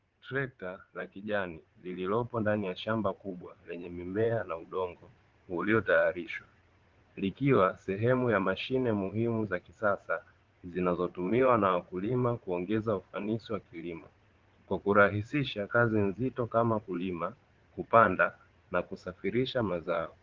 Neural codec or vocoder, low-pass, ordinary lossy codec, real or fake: vocoder, 22.05 kHz, 80 mel bands, Vocos; 7.2 kHz; Opus, 16 kbps; fake